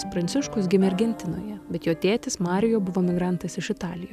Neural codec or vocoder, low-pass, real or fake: none; 14.4 kHz; real